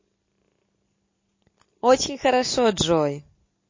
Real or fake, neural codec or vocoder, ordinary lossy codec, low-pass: real; none; MP3, 32 kbps; 7.2 kHz